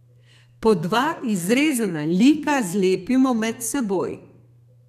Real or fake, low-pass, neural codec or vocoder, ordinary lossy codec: fake; 14.4 kHz; codec, 32 kHz, 1.9 kbps, SNAC; none